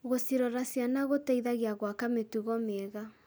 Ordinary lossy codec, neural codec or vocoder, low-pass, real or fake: none; none; none; real